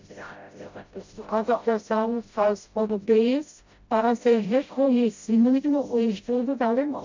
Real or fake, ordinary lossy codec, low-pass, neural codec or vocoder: fake; MP3, 48 kbps; 7.2 kHz; codec, 16 kHz, 0.5 kbps, FreqCodec, smaller model